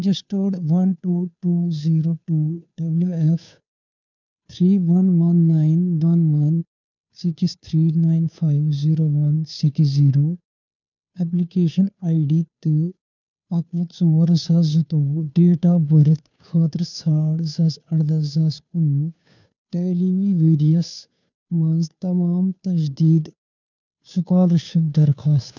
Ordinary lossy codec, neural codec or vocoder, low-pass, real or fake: none; codec, 16 kHz, 2 kbps, FunCodec, trained on Chinese and English, 25 frames a second; 7.2 kHz; fake